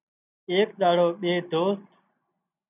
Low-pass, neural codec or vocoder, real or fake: 3.6 kHz; none; real